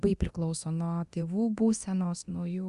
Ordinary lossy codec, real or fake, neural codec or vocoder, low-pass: Opus, 64 kbps; fake; codec, 24 kHz, 0.9 kbps, DualCodec; 10.8 kHz